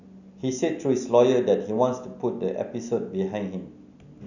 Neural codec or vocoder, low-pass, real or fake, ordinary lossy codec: none; 7.2 kHz; real; none